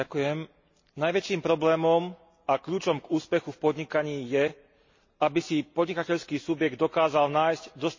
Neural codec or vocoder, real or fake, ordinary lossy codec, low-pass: none; real; MP3, 32 kbps; 7.2 kHz